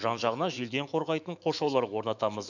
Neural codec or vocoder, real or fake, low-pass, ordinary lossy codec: autoencoder, 48 kHz, 128 numbers a frame, DAC-VAE, trained on Japanese speech; fake; 7.2 kHz; none